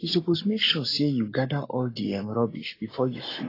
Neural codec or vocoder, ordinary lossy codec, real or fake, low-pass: codec, 44.1 kHz, 7.8 kbps, Pupu-Codec; AAC, 24 kbps; fake; 5.4 kHz